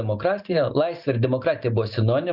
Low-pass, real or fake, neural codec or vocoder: 5.4 kHz; real; none